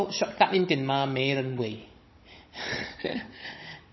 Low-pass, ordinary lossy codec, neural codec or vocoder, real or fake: 7.2 kHz; MP3, 24 kbps; codec, 16 kHz, 16 kbps, FunCodec, trained on Chinese and English, 50 frames a second; fake